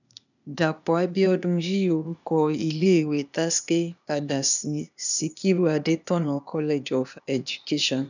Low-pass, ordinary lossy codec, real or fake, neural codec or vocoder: 7.2 kHz; none; fake; codec, 16 kHz, 0.8 kbps, ZipCodec